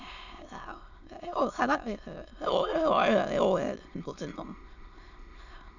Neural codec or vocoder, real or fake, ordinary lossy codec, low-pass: autoencoder, 22.05 kHz, a latent of 192 numbers a frame, VITS, trained on many speakers; fake; none; 7.2 kHz